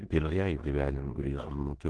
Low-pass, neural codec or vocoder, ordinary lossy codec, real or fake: 10.8 kHz; codec, 16 kHz in and 24 kHz out, 0.9 kbps, LongCat-Audio-Codec, four codebook decoder; Opus, 16 kbps; fake